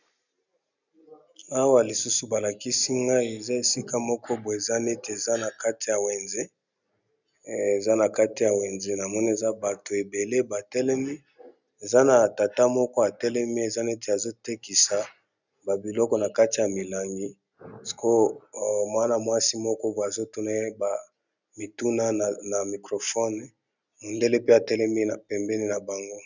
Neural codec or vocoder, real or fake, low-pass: none; real; 7.2 kHz